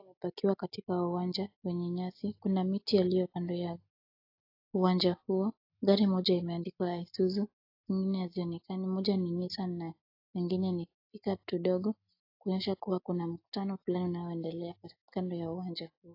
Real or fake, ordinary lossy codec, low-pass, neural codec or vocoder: real; AAC, 32 kbps; 5.4 kHz; none